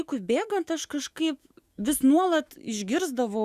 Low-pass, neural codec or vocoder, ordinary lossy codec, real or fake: 14.4 kHz; autoencoder, 48 kHz, 128 numbers a frame, DAC-VAE, trained on Japanese speech; Opus, 64 kbps; fake